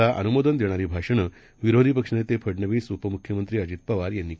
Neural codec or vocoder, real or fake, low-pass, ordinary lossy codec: none; real; none; none